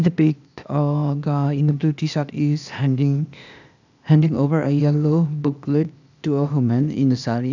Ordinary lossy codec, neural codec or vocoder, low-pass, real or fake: none; codec, 16 kHz, 0.8 kbps, ZipCodec; 7.2 kHz; fake